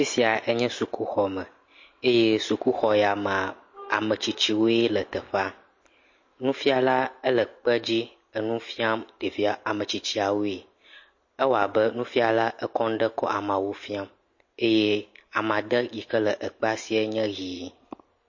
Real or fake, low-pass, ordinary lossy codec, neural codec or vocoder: real; 7.2 kHz; MP3, 32 kbps; none